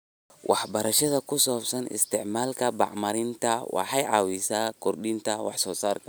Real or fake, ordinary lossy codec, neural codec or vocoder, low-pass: real; none; none; none